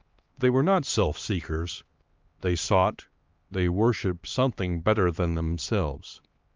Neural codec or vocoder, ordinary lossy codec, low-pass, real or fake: codec, 16 kHz, 2 kbps, X-Codec, HuBERT features, trained on LibriSpeech; Opus, 32 kbps; 7.2 kHz; fake